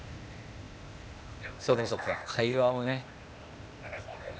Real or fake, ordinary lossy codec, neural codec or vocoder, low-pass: fake; none; codec, 16 kHz, 0.8 kbps, ZipCodec; none